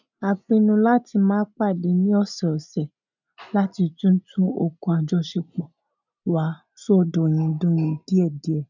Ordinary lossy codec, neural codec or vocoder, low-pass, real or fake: none; none; 7.2 kHz; real